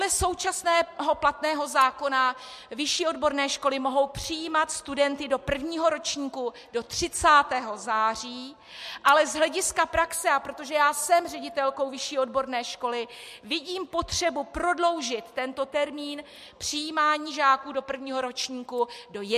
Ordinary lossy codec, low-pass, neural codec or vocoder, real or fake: MP3, 64 kbps; 14.4 kHz; none; real